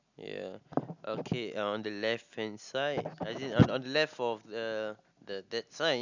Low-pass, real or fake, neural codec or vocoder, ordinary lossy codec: 7.2 kHz; real; none; none